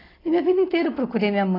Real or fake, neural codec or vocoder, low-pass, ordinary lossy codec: real; none; 5.4 kHz; AAC, 24 kbps